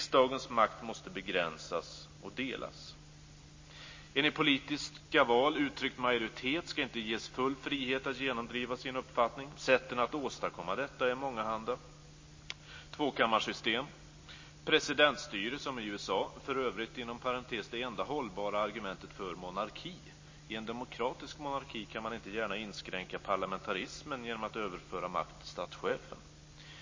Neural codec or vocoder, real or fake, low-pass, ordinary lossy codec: none; real; 7.2 kHz; MP3, 32 kbps